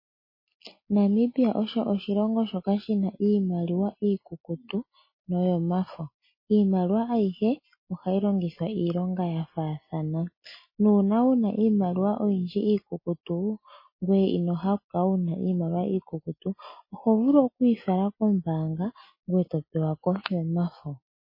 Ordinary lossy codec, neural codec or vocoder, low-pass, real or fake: MP3, 24 kbps; none; 5.4 kHz; real